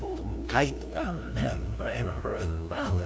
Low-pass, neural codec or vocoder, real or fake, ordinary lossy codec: none; codec, 16 kHz, 0.5 kbps, FunCodec, trained on LibriTTS, 25 frames a second; fake; none